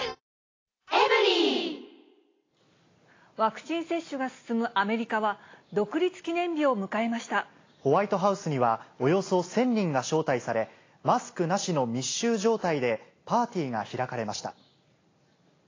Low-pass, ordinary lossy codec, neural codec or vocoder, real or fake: 7.2 kHz; AAC, 32 kbps; none; real